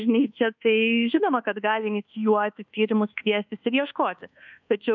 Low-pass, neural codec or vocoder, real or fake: 7.2 kHz; codec, 24 kHz, 1.2 kbps, DualCodec; fake